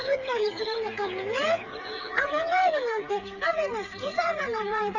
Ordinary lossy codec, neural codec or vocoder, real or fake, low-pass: none; codec, 16 kHz, 4 kbps, FreqCodec, smaller model; fake; 7.2 kHz